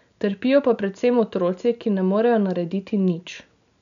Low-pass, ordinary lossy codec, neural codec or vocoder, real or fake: 7.2 kHz; none; none; real